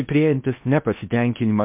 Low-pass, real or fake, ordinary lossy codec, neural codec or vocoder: 3.6 kHz; fake; MP3, 32 kbps; codec, 16 kHz in and 24 kHz out, 0.6 kbps, FocalCodec, streaming, 4096 codes